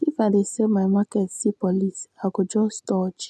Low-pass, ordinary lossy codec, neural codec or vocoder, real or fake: none; none; none; real